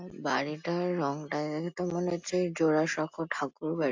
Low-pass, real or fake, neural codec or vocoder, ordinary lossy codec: 7.2 kHz; real; none; AAC, 48 kbps